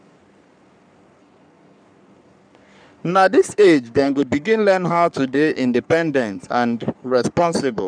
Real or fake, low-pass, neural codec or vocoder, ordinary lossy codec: fake; 9.9 kHz; codec, 44.1 kHz, 3.4 kbps, Pupu-Codec; none